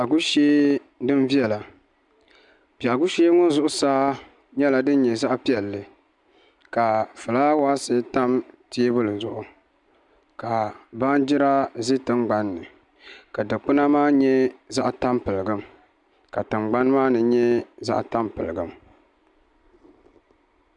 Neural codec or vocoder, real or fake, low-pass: none; real; 10.8 kHz